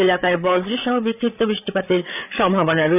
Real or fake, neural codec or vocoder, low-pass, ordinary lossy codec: fake; codec, 16 kHz, 8 kbps, FreqCodec, larger model; 3.6 kHz; none